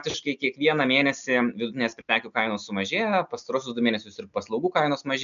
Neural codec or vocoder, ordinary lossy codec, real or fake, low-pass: none; MP3, 96 kbps; real; 7.2 kHz